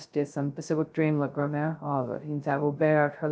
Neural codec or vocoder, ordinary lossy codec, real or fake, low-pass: codec, 16 kHz, 0.2 kbps, FocalCodec; none; fake; none